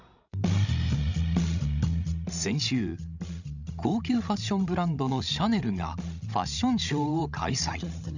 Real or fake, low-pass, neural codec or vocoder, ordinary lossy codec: fake; 7.2 kHz; codec, 16 kHz, 16 kbps, FreqCodec, larger model; none